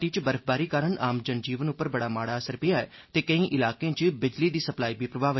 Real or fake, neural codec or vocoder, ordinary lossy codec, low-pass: real; none; MP3, 24 kbps; 7.2 kHz